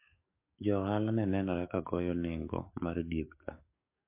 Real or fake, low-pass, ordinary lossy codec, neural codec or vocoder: fake; 3.6 kHz; MP3, 24 kbps; codec, 44.1 kHz, 7.8 kbps, DAC